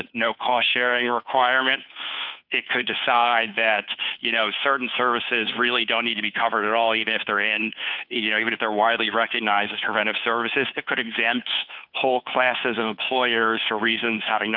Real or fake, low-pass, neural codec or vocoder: fake; 5.4 kHz; codec, 16 kHz, 2 kbps, FunCodec, trained on Chinese and English, 25 frames a second